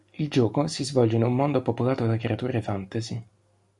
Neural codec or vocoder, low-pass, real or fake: none; 10.8 kHz; real